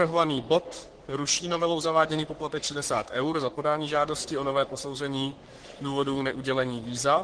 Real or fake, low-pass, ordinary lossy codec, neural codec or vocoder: fake; 9.9 kHz; Opus, 16 kbps; codec, 44.1 kHz, 3.4 kbps, Pupu-Codec